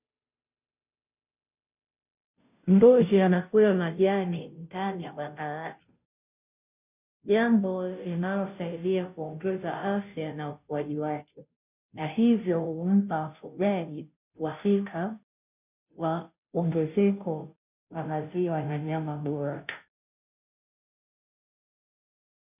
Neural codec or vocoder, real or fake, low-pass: codec, 16 kHz, 0.5 kbps, FunCodec, trained on Chinese and English, 25 frames a second; fake; 3.6 kHz